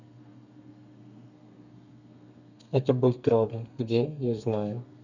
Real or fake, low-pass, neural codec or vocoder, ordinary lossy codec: fake; 7.2 kHz; codec, 32 kHz, 1.9 kbps, SNAC; none